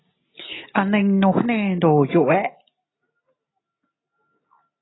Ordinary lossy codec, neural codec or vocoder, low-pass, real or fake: AAC, 16 kbps; none; 7.2 kHz; real